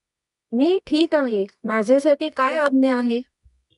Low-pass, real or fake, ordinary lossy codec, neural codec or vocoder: 10.8 kHz; fake; MP3, 96 kbps; codec, 24 kHz, 0.9 kbps, WavTokenizer, medium music audio release